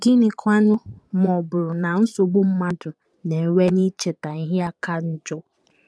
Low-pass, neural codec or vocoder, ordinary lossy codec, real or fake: none; vocoder, 22.05 kHz, 80 mel bands, Vocos; none; fake